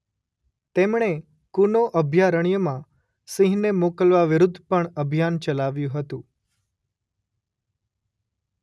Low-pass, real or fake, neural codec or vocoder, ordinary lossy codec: none; real; none; none